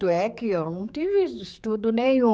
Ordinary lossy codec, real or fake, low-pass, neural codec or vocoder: none; fake; none; codec, 16 kHz, 4 kbps, X-Codec, HuBERT features, trained on general audio